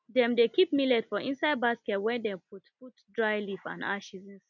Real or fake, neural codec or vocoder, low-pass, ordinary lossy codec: real; none; 7.2 kHz; none